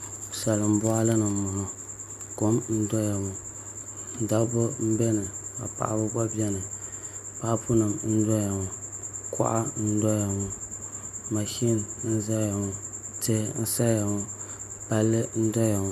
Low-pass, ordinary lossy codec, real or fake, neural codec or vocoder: 14.4 kHz; MP3, 96 kbps; real; none